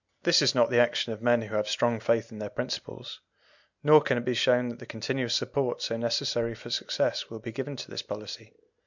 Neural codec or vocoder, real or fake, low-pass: none; real; 7.2 kHz